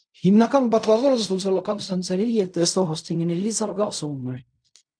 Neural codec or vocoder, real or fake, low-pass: codec, 16 kHz in and 24 kHz out, 0.4 kbps, LongCat-Audio-Codec, fine tuned four codebook decoder; fake; 9.9 kHz